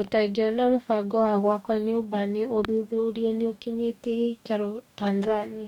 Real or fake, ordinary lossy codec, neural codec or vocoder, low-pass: fake; none; codec, 44.1 kHz, 2.6 kbps, DAC; 19.8 kHz